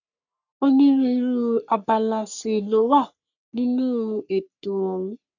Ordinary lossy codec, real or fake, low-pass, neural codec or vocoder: none; fake; 7.2 kHz; codec, 44.1 kHz, 3.4 kbps, Pupu-Codec